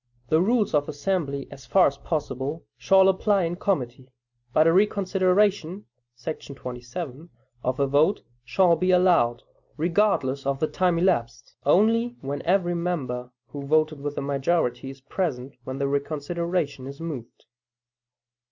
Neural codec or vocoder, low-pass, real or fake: none; 7.2 kHz; real